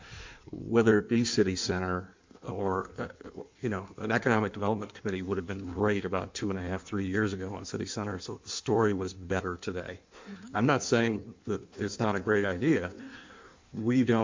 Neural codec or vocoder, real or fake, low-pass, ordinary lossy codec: codec, 16 kHz in and 24 kHz out, 1.1 kbps, FireRedTTS-2 codec; fake; 7.2 kHz; MP3, 64 kbps